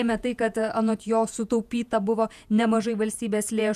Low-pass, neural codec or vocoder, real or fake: 14.4 kHz; vocoder, 48 kHz, 128 mel bands, Vocos; fake